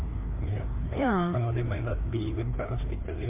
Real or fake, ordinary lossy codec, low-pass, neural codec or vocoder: fake; MP3, 24 kbps; 3.6 kHz; codec, 16 kHz, 2 kbps, FreqCodec, larger model